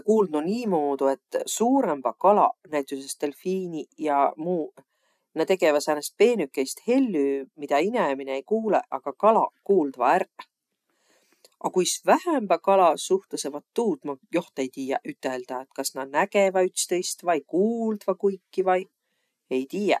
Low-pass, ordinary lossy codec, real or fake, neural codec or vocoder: 14.4 kHz; none; real; none